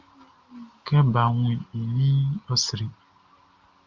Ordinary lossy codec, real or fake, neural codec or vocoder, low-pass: Opus, 32 kbps; real; none; 7.2 kHz